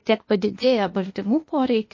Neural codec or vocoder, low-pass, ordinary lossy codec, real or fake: codec, 16 kHz, 0.8 kbps, ZipCodec; 7.2 kHz; MP3, 32 kbps; fake